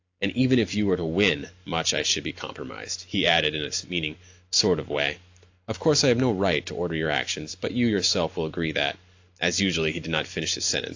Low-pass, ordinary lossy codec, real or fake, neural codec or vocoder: 7.2 kHz; AAC, 48 kbps; real; none